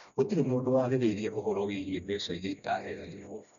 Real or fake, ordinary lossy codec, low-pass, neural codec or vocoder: fake; none; 7.2 kHz; codec, 16 kHz, 1 kbps, FreqCodec, smaller model